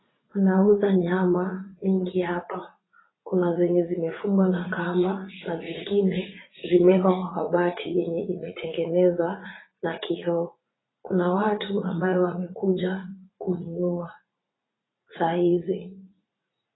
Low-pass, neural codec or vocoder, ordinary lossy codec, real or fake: 7.2 kHz; vocoder, 44.1 kHz, 80 mel bands, Vocos; AAC, 16 kbps; fake